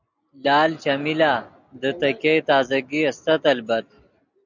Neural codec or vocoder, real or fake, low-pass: none; real; 7.2 kHz